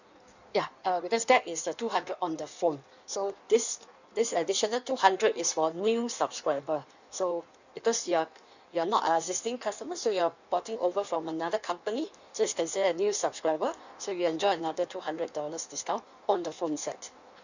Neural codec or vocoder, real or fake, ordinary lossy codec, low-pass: codec, 16 kHz in and 24 kHz out, 1.1 kbps, FireRedTTS-2 codec; fake; none; 7.2 kHz